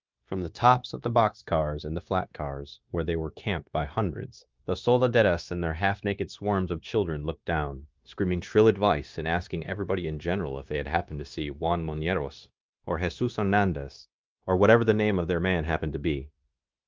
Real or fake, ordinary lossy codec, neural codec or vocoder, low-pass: fake; Opus, 24 kbps; codec, 16 kHz, 0.9 kbps, LongCat-Audio-Codec; 7.2 kHz